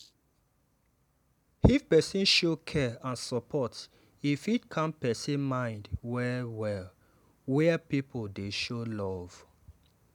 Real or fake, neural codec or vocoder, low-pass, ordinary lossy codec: real; none; 19.8 kHz; none